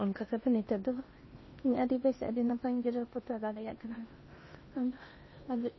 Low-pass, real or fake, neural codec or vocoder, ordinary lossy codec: 7.2 kHz; fake; codec, 16 kHz in and 24 kHz out, 0.8 kbps, FocalCodec, streaming, 65536 codes; MP3, 24 kbps